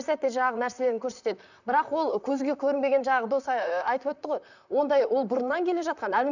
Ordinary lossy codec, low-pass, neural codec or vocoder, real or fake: none; 7.2 kHz; vocoder, 44.1 kHz, 128 mel bands, Pupu-Vocoder; fake